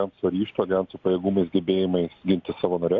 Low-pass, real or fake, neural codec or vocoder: 7.2 kHz; real; none